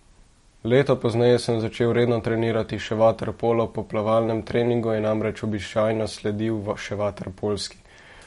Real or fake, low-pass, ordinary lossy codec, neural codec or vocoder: real; 19.8 kHz; MP3, 48 kbps; none